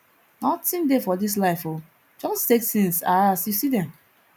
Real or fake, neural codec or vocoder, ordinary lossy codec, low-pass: real; none; none; none